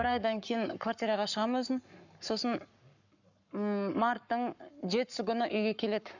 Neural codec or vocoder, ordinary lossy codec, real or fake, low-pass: codec, 44.1 kHz, 7.8 kbps, Pupu-Codec; none; fake; 7.2 kHz